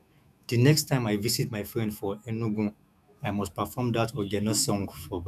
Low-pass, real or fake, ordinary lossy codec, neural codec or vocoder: 14.4 kHz; fake; none; autoencoder, 48 kHz, 128 numbers a frame, DAC-VAE, trained on Japanese speech